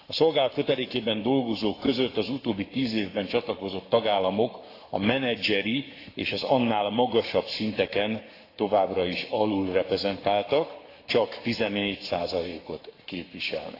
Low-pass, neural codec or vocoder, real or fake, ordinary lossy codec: 5.4 kHz; codec, 44.1 kHz, 7.8 kbps, Pupu-Codec; fake; AAC, 32 kbps